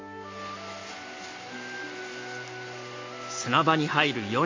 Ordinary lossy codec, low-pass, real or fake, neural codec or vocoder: MP3, 32 kbps; 7.2 kHz; real; none